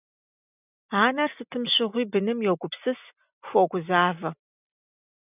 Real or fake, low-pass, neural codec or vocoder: real; 3.6 kHz; none